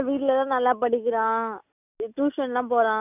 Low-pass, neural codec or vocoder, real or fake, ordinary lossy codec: 3.6 kHz; none; real; none